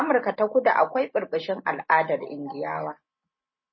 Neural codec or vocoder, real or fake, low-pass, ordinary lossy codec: none; real; 7.2 kHz; MP3, 24 kbps